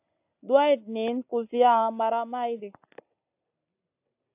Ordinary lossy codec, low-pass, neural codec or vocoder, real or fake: AAC, 32 kbps; 3.6 kHz; none; real